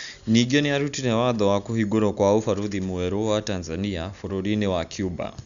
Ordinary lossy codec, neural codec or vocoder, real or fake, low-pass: none; none; real; 7.2 kHz